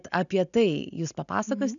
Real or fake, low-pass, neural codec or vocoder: real; 7.2 kHz; none